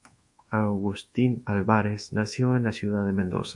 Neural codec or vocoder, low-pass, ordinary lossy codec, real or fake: codec, 24 kHz, 1.2 kbps, DualCodec; 10.8 kHz; MP3, 48 kbps; fake